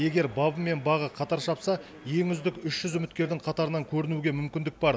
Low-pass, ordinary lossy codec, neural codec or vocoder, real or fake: none; none; none; real